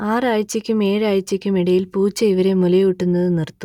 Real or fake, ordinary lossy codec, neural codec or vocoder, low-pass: real; none; none; 19.8 kHz